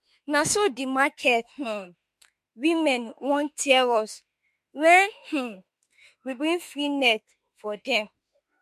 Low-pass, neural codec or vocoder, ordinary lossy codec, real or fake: 14.4 kHz; autoencoder, 48 kHz, 32 numbers a frame, DAC-VAE, trained on Japanese speech; MP3, 64 kbps; fake